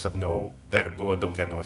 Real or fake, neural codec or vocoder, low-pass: fake; codec, 24 kHz, 0.9 kbps, WavTokenizer, medium music audio release; 10.8 kHz